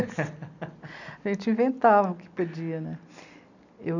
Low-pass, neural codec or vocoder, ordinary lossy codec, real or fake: 7.2 kHz; none; none; real